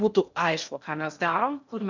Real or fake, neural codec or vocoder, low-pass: fake; codec, 16 kHz in and 24 kHz out, 0.6 kbps, FocalCodec, streaming, 2048 codes; 7.2 kHz